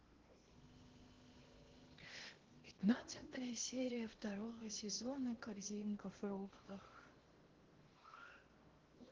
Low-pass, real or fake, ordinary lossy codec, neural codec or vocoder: 7.2 kHz; fake; Opus, 16 kbps; codec, 16 kHz in and 24 kHz out, 0.8 kbps, FocalCodec, streaming, 65536 codes